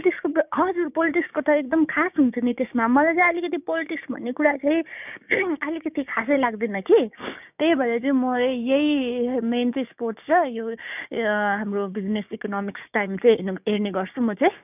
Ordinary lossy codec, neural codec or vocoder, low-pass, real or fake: none; codec, 16 kHz, 6 kbps, DAC; 3.6 kHz; fake